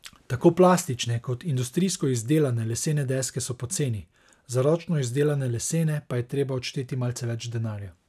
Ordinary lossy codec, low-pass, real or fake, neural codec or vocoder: none; 14.4 kHz; real; none